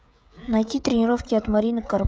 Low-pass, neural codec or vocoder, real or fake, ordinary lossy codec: none; codec, 16 kHz, 16 kbps, FreqCodec, smaller model; fake; none